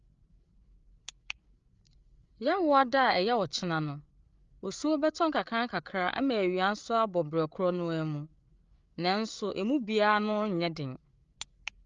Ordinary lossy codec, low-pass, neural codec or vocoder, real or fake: Opus, 32 kbps; 7.2 kHz; codec, 16 kHz, 8 kbps, FreqCodec, larger model; fake